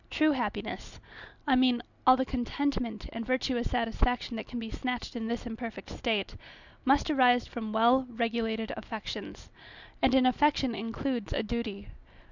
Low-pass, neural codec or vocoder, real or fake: 7.2 kHz; none; real